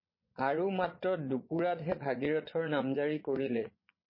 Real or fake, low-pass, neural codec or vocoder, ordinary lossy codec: fake; 5.4 kHz; vocoder, 22.05 kHz, 80 mel bands, Vocos; MP3, 24 kbps